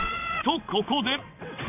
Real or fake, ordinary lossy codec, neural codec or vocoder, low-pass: fake; none; vocoder, 22.05 kHz, 80 mel bands, WaveNeXt; 3.6 kHz